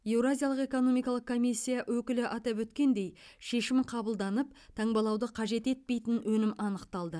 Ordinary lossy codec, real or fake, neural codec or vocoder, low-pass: none; real; none; none